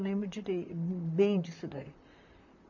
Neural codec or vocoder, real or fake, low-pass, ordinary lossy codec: vocoder, 44.1 kHz, 128 mel bands, Pupu-Vocoder; fake; 7.2 kHz; none